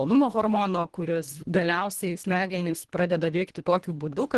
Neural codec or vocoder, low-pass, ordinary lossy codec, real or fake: codec, 24 kHz, 1.5 kbps, HILCodec; 10.8 kHz; Opus, 16 kbps; fake